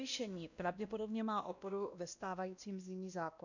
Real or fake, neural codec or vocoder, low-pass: fake; codec, 16 kHz, 1 kbps, X-Codec, WavLM features, trained on Multilingual LibriSpeech; 7.2 kHz